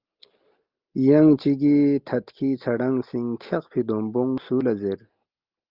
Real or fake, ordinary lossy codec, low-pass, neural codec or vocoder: real; Opus, 32 kbps; 5.4 kHz; none